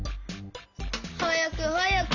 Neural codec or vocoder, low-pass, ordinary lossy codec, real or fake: none; 7.2 kHz; none; real